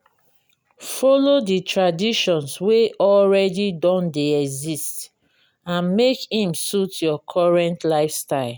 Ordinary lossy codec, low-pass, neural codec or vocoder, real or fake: none; none; none; real